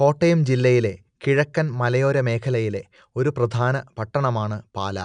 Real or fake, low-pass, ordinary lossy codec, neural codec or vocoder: real; 9.9 kHz; none; none